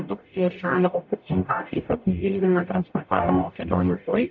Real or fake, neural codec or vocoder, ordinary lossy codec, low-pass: fake; codec, 44.1 kHz, 0.9 kbps, DAC; MP3, 64 kbps; 7.2 kHz